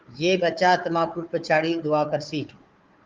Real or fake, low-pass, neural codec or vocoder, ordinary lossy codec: fake; 7.2 kHz; codec, 16 kHz, 4 kbps, FunCodec, trained on Chinese and English, 50 frames a second; Opus, 24 kbps